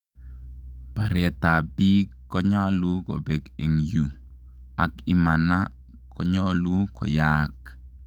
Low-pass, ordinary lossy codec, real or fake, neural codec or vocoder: 19.8 kHz; none; fake; codec, 44.1 kHz, 7.8 kbps, DAC